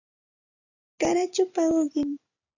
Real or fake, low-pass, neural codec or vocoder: real; 7.2 kHz; none